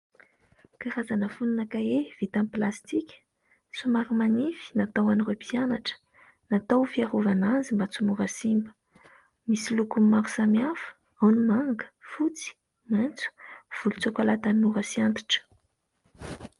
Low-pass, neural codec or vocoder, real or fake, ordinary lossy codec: 10.8 kHz; none; real; Opus, 32 kbps